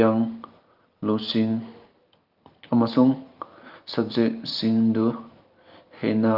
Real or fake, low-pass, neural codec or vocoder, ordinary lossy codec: real; 5.4 kHz; none; Opus, 24 kbps